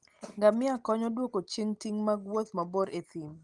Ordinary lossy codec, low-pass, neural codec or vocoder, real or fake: Opus, 24 kbps; 10.8 kHz; none; real